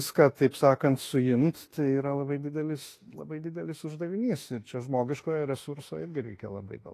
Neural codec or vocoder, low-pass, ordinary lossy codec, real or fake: autoencoder, 48 kHz, 32 numbers a frame, DAC-VAE, trained on Japanese speech; 14.4 kHz; AAC, 48 kbps; fake